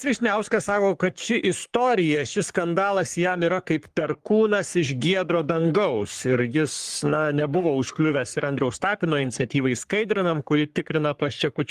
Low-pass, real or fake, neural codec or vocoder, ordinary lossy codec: 14.4 kHz; fake; codec, 44.1 kHz, 3.4 kbps, Pupu-Codec; Opus, 24 kbps